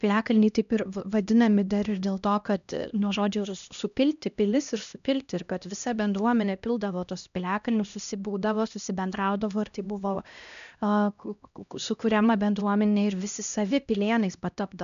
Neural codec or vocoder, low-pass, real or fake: codec, 16 kHz, 1 kbps, X-Codec, HuBERT features, trained on LibriSpeech; 7.2 kHz; fake